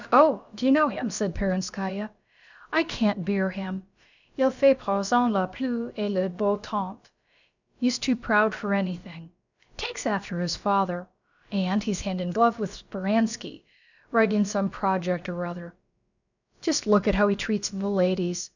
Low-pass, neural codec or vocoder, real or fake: 7.2 kHz; codec, 16 kHz, about 1 kbps, DyCAST, with the encoder's durations; fake